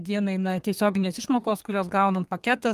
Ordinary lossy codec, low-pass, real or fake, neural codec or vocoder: Opus, 32 kbps; 14.4 kHz; fake; codec, 32 kHz, 1.9 kbps, SNAC